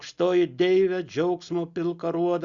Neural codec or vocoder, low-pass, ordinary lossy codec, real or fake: none; 7.2 kHz; Opus, 64 kbps; real